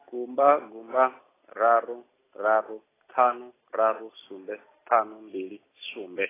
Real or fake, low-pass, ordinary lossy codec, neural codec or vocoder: real; 3.6 kHz; AAC, 16 kbps; none